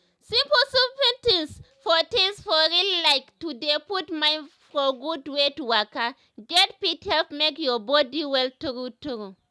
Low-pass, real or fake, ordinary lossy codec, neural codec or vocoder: none; real; none; none